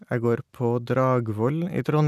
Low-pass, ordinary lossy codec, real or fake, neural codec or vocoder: 14.4 kHz; none; real; none